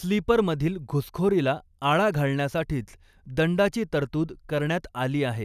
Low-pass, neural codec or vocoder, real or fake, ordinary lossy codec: 14.4 kHz; none; real; none